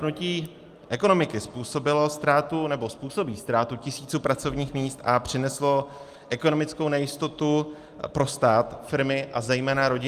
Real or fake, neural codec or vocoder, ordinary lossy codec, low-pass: real; none; Opus, 32 kbps; 14.4 kHz